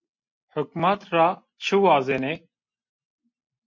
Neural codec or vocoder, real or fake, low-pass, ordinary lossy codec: none; real; 7.2 kHz; MP3, 48 kbps